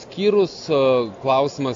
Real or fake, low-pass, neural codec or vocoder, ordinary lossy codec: real; 7.2 kHz; none; AAC, 48 kbps